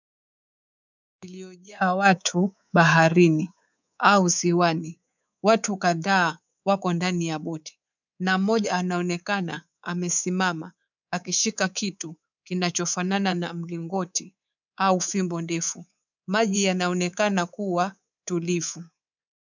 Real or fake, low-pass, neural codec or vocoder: fake; 7.2 kHz; codec, 24 kHz, 3.1 kbps, DualCodec